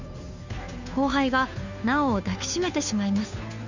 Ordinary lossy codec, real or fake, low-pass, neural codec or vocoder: none; fake; 7.2 kHz; codec, 16 kHz, 2 kbps, FunCodec, trained on Chinese and English, 25 frames a second